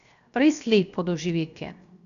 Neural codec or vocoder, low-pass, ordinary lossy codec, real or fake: codec, 16 kHz, 0.7 kbps, FocalCodec; 7.2 kHz; none; fake